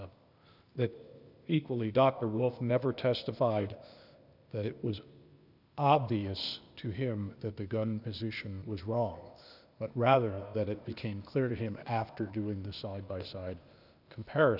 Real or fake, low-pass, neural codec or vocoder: fake; 5.4 kHz; codec, 16 kHz, 0.8 kbps, ZipCodec